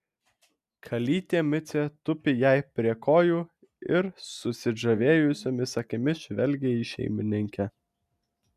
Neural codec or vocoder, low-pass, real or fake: none; 14.4 kHz; real